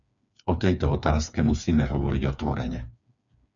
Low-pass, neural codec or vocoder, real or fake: 7.2 kHz; codec, 16 kHz, 4 kbps, FreqCodec, smaller model; fake